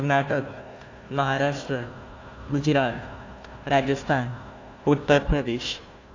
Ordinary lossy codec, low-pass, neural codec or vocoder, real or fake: AAC, 48 kbps; 7.2 kHz; codec, 16 kHz, 1 kbps, FunCodec, trained on Chinese and English, 50 frames a second; fake